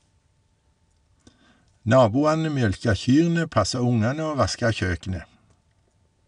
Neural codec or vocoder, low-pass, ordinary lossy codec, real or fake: none; 9.9 kHz; none; real